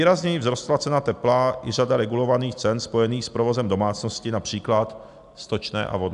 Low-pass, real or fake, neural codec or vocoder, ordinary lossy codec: 10.8 kHz; real; none; AAC, 96 kbps